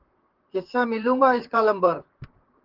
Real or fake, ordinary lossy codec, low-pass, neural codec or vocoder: fake; Opus, 16 kbps; 5.4 kHz; vocoder, 44.1 kHz, 128 mel bands, Pupu-Vocoder